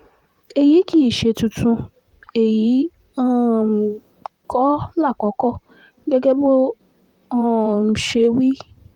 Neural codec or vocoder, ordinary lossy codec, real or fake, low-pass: vocoder, 44.1 kHz, 128 mel bands, Pupu-Vocoder; Opus, 32 kbps; fake; 19.8 kHz